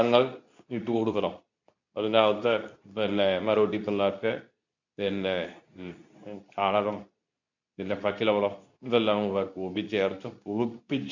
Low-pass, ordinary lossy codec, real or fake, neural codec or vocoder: 7.2 kHz; none; fake; codec, 24 kHz, 0.9 kbps, WavTokenizer, medium speech release version 2